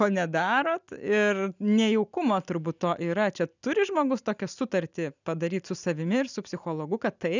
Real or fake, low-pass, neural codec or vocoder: real; 7.2 kHz; none